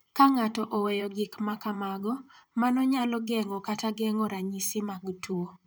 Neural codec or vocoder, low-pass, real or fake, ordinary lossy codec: vocoder, 44.1 kHz, 128 mel bands, Pupu-Vocoder; none; fake; none